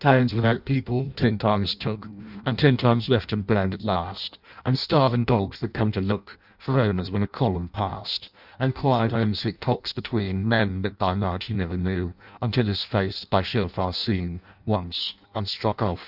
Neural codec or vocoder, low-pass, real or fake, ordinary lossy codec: codec, 16 kHz in and 24 kHz out, 0.6 kbps, FireRedTTS-2 codec; 5.4 kHz; fake; Opus, 64 kbps